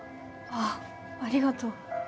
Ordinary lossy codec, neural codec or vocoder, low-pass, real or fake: none; none; none; real